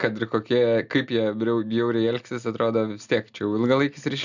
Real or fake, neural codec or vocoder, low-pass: real; none; 7.2 kHz